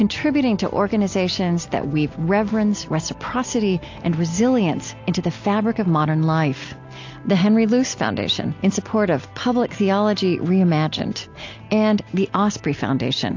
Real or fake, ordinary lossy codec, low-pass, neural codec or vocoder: real; AAC, 48 kbps; 7.2 kHz; none